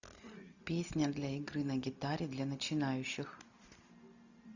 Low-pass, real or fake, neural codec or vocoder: 7.2 kHz; real; none